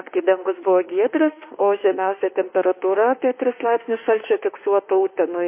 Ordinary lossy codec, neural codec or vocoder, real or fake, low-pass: MP3, 24 kbps; autoencoder, 48 kHz, 32 numbers a frame, DAC-VAE, trained on Japanese speech; fake; 3.6 kHz